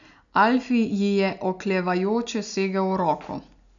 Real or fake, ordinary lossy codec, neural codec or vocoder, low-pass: real; none; none; 7.2 kHz